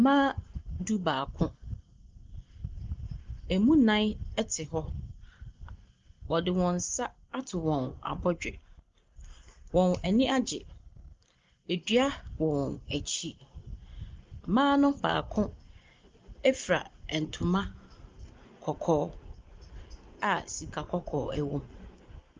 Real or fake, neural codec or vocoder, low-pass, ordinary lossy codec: real; none; 7.2 kHz; Opus, 32 kbps